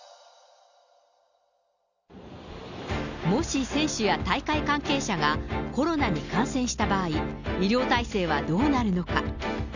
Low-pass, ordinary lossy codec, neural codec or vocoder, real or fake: 7.2 kHz; none; none; real